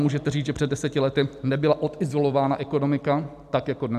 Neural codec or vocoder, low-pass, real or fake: vocoder, 44.1 kHz, 128 mel bands every 256 samples, BigVGAN v2; 14.4 kHz; fake